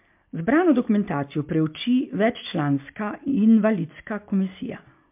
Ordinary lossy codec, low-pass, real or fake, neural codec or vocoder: MP3, 32 kbps; 3.6 kHz; real; none